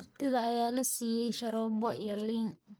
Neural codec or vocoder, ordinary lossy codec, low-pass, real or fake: codec, 44.1 kHz, 1.7 kbps, Pupu-Codec; none; none; fake